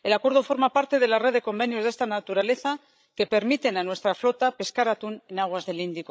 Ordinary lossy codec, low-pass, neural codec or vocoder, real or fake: none; none; codec, 16 kHz, 16 kbps, FreqCodec, larger model; fake